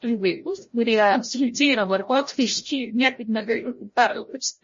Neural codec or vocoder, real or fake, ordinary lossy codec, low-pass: codec, 16 kHz, 0.5 kbps, FreqCodec, larger model; fake; MP3, 32 kbps; 7.2 kHz